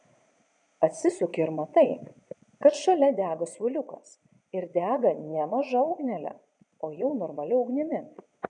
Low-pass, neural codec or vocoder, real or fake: 9.9 kHz; vocoder, 22.05 kHz, 80 mel bands, Vocos; fake